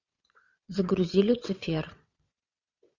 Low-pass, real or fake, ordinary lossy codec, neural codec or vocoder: 7.2 kHz; fake; Opus, 64 kbps; vocoder, 22.05 kHz, 80 mel bands, WaveNeXt